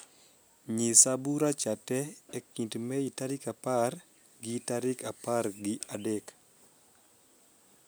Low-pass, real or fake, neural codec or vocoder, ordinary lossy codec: none; real; none; none